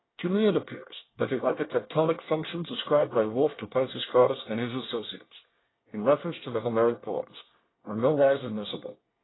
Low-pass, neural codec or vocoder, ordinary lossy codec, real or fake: 7.2 kHz; codec, 24 kHz, 1 kbps, SNAC; AAC, 16 kbps; fake